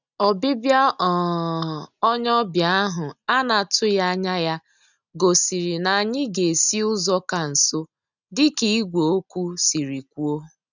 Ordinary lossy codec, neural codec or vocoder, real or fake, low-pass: none; none; real; 7.2 kHz